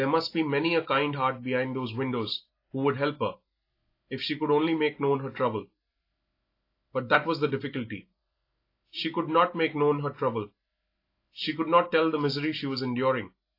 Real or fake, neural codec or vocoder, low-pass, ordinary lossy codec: real; none; 5.4 kHz; AAC, 32 kbps